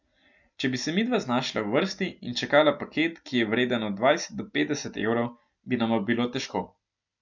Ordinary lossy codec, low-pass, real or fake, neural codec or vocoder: MP3, 64 kbps; 7.2 kHz; real; none